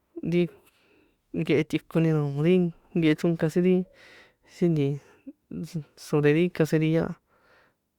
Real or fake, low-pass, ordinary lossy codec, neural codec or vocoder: fake; 19.8 kHz; Opus, 64 kbps; autoencoder, 48 kHz, 32 numbers a frame, DAC-VAE, trained on Japanese speech